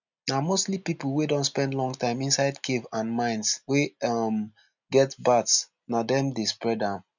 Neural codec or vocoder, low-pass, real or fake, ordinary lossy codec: none; 7.2 kHz; real; none